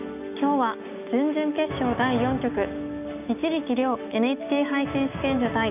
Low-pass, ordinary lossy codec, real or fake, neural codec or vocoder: 3.6 kHz; none; fake; codec, 16 kHz, 6 kbps, DAC